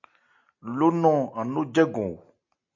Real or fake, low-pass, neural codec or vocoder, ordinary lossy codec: real; 7.2 kHz; none; MP3, 64 kbps